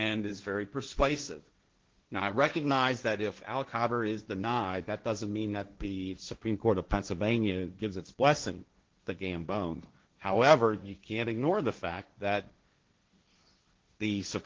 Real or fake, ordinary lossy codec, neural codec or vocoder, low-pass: fake; Opus, 16 kbps; codec, 16 kHz, 1.1 kbps, Voila-Tokenizer; 7.2 kHz